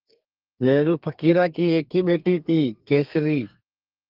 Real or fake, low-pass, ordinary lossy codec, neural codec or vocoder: fake; 5.4 kHz; Opus, 32 kbps; codec, 32 kHz, 1.9 kbps, SNAC